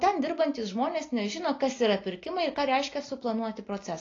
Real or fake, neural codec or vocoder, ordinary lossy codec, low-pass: real; none; AAC, 32 kbps; 7.2 kHz